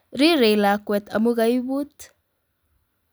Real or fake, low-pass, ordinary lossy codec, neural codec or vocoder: real; none; none; none